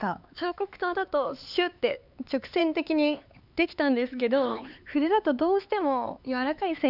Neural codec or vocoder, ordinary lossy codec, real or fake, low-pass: codec, 16 kHz, 4 kbps, X-Codec, HuBERT features, trained on LibriSpeech; none; fake; 5.4 kHz